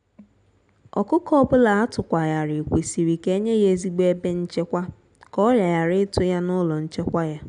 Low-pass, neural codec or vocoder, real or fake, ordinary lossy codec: 9.9 kHz; none; real; none